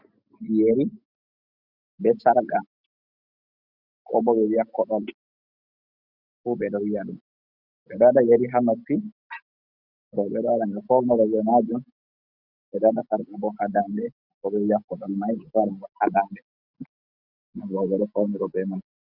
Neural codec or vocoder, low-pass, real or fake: none; 5.4 kHz; real